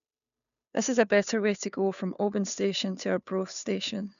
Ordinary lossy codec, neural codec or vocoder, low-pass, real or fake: none; codec, 16 kHz, 2 kbps, FunCodec, trained on Chinese and English, 25 frames a second; 7.2 kHz; fake